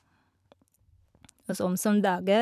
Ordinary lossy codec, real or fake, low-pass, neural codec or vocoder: none; fake; 14.4 kHz; vocoder, 44.1 kHz, 128 mel bands every 256 samples, BigVGAN v2